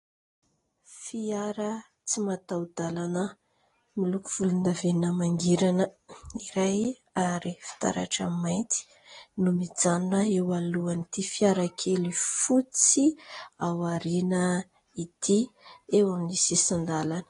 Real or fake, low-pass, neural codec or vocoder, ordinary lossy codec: real; 10.8 kHz; none; AAC, 32 kbps